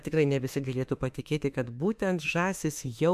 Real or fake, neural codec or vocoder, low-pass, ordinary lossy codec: fake; autoencoder, 48 kHz, 32 numbers a frame, DAC-VAE, trained on Japanese speech; 14.4 kHz; MP3, 96 kbps